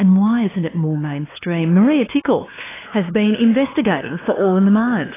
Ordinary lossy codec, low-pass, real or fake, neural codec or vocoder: AAC, 16 kbps; 3.6 kHz; fake; codec, 16 kHz, 2 kbps, FunCodec, trained on LibriTTS, 25 frames a second